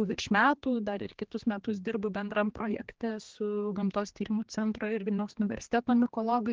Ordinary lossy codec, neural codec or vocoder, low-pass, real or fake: Opus, 16 kbps; codec, 16 kHz, 2 kbps, X-Codec, HuBERT features, trained on general audio; 7.2 kHz; fake